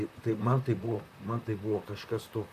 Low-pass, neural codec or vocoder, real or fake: 14.4 kHz; vocoder, 44.1 kHz, 128 mel bands, Pupu-Vocoder; fake